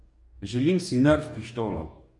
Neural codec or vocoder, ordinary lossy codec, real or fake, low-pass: codec, 44.1 kHz, 2.6 kbps, DAC; MP3, 48 kbps; fake; 10.8 kHz